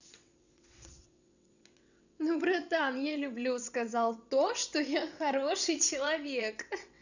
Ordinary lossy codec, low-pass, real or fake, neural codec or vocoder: none; 7.2 kHz; fake; vocoder, 22.05 kHz, 80 mel bands, WaveNeXt